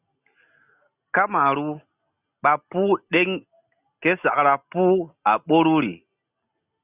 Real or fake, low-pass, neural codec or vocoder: real; 3.6 kHz; none